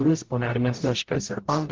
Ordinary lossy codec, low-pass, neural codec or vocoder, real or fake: Opus, 16 kbps; 7.2 kHz; codec, 44.1 kHz, 0.9 kbps, DAC; fake